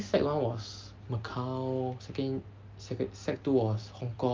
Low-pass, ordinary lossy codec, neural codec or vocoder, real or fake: 7.2 kHz; Opus, 32 kbps; none; real